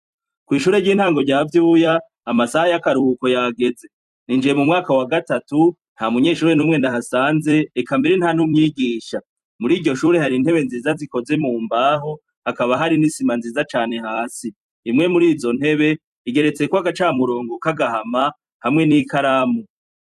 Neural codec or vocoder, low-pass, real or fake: vocoder, 44.1 kHz, 128 mel bands every 256 samples, BigVGAN v2; 14.4 kHz; fake